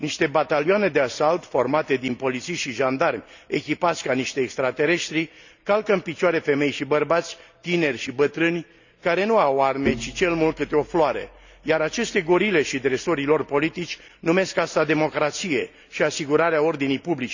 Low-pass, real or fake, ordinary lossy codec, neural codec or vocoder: 7.2 kHz; real; none; none